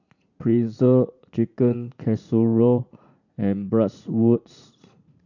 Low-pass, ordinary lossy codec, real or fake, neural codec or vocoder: 7.2 kHz; none; fake; vocoder, 44.1 kHz, 80 mel bands, Vocos